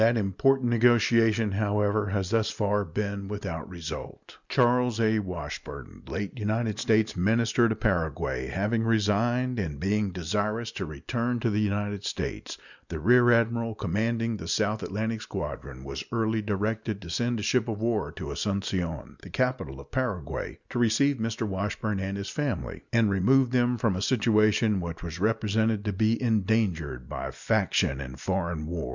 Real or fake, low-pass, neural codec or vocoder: real; 7.2 kHz; none